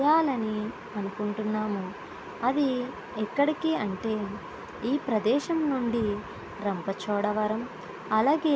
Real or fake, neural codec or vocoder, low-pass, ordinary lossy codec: real; none; none; none